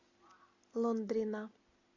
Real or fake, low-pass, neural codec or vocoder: real; 7.2 kHz; none